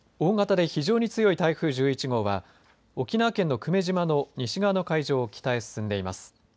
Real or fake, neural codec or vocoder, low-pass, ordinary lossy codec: real; none; none; none